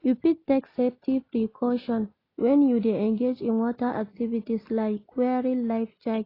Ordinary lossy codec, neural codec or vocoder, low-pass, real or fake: AAC, 24 kbps; none; 5.4 kHz; real